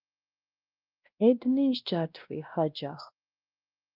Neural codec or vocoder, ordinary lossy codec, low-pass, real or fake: codec, 16 kHz, 1 kbps, X-Codec, WavLM features, trained on Multilingual LibriSpeech; Opus, 24 kbps; 5.4 kHz; fake